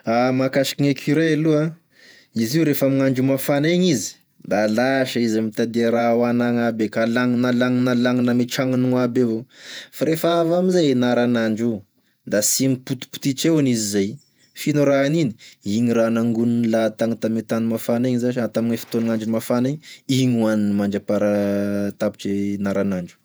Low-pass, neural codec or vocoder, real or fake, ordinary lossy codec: none; vocoder, 48 kHz, 128 mel bands, Vocos; fake; none